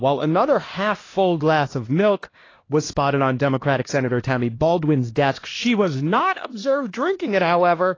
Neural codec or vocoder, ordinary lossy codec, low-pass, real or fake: codec, 16 kHz, 1 kbps, X-Codec, HuBERT features, trained on LibriSpeech; AAC, 32 kbps; 7.2 kHz; fake